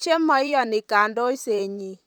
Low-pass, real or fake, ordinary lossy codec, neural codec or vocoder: none; fake; none; vocoder, 44.1 kHz, 128 mel bands, Pupu-Vocoder